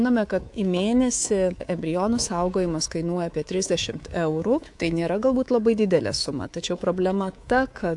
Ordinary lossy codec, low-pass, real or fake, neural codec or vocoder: AAC, 64 kbps; 10.8 kHz; fake; codec, 24 kHz, 3.1 kbps, DualCodec